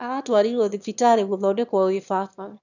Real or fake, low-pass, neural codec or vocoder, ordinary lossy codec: fake; 7.2 kHz; autoencoder, 22.05 kHz, a latent of 192 numbers a frame, VITS, trained on one speaker; none